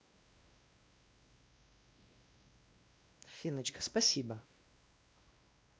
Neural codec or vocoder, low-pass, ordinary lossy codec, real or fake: codec, 16 kHz, 1 kbps, X-Codec, WavLM features, trained on Multilingual LibriSpeech; none; none; fake